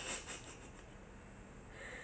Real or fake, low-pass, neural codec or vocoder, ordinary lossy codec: real; none; none; none